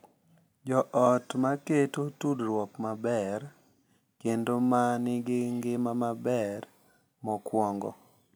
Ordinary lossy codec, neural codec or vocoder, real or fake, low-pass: none; none; real; none